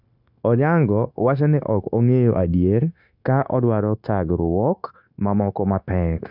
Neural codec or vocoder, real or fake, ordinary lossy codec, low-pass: codec, 16 kHz, 0.9 kbps, LongCat-Audio-Codec; fake; none; 5.4 kHz